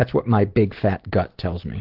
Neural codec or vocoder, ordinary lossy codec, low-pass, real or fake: none; Opus, 16 kbps; 5.4 kHz; real